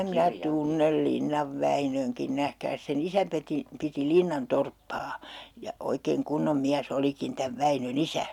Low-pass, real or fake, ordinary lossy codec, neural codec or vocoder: 19.8 kHz; real; none; none